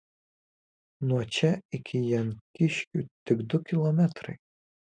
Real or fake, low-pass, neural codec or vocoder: real; 9.9 kHz; none